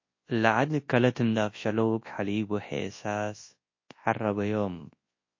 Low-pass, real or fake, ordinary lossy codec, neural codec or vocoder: 7.2 kHz; fake; MP3, 32 kbps; codec, 24 kHz, 0.9 kbps, WavTokenizer, large speech release